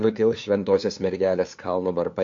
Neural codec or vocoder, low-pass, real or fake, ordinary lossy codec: codec, 16 kHz, 2 kbps, FunCodec, trained on LibriTTS, 25 frames a second; 7.2 kHz; fake; AAC, 64 kbps